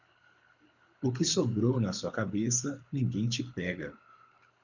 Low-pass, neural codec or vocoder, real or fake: 7.2 kHz; codec, 24 kHz, 6 kbps, HILCodec; fake